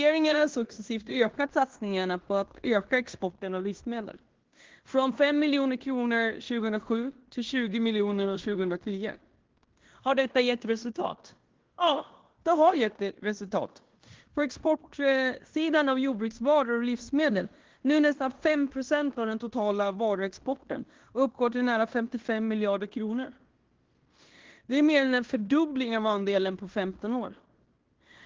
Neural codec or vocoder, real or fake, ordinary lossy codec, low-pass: codec, 16 kHz in and 24 kHz out, 0.9 kbps, LongCat-Audio-Codec, fine tuned four codebook decoder; fake; Opus, 16 kbps; 7.2 kHz